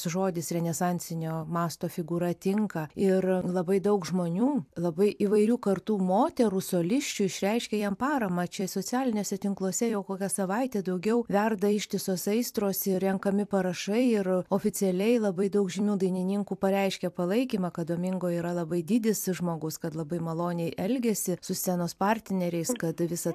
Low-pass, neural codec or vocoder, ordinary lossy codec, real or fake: 14.4 kHz; vocoder, 44.1 kHz, 128 mel bands every 256 samples, BigVGAN v2; AAC, 96 kbps; fake